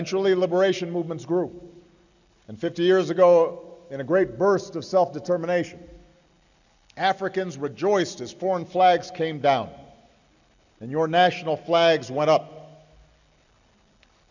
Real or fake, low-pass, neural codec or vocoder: real; 7.2 kHz; none